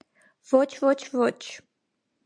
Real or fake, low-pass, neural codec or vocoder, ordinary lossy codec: real; 9.9 kHz; none; AAC, 64 kbps